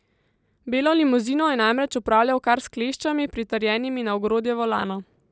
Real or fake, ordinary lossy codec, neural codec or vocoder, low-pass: real; none; none; none